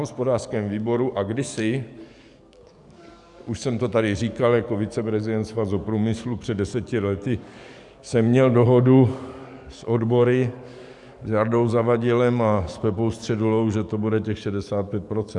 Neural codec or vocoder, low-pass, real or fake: codec, 44.1 kHz, 7.8 kbps, DAC; 10.8 kHz; fake